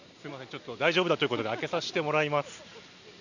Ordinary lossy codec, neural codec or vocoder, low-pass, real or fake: none; none; 7.2 kHz; real